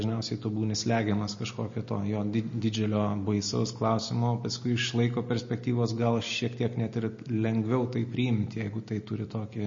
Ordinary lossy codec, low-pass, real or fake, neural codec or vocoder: MP3, 32 kbps; 7.2 kHz; real; none